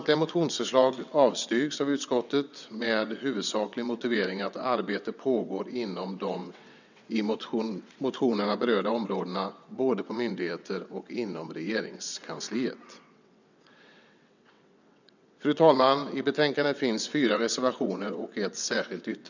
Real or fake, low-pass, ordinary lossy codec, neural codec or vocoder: fake; 7.2 kHz; none; vocoder, 22.05 kHz, 80 mel bands, WaveNeXt